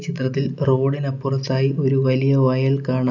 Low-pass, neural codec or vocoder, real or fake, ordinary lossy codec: 7.2 kHz; none; real; none